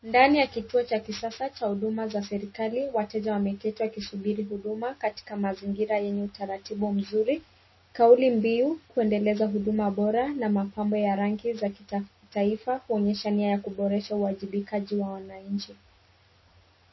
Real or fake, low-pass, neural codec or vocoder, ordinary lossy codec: real; 7.2 kHz; none; MP3, 24 kbps